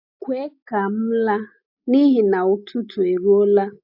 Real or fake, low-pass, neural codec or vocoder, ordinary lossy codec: real; 5.4 kHz; none; AAC, 48 kbps